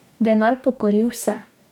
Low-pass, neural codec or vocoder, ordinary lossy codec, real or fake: 19.8 kHz; codec, 44.1 kHz, 2.6 kbps, DAC; none; fake